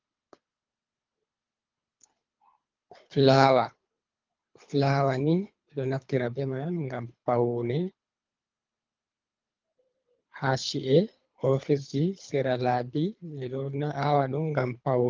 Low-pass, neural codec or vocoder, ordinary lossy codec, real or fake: 7.2 kHz; codec, 24 kHz, 3 kbps, HILCodec; Opus, 24 kbps; fake